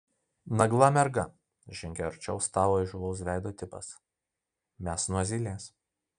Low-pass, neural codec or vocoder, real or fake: 9.9 kHz; none; real